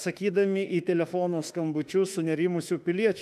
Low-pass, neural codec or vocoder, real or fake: 14.4 kHz; autoencoder, 48 kHz, 32 numbers a frame, DAC-VAE, trained on Japanese speech; fake